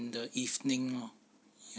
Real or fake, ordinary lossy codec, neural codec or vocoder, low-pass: real; none; none; none